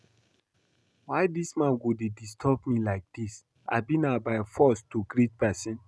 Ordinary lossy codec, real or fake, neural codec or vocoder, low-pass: none; real; none; none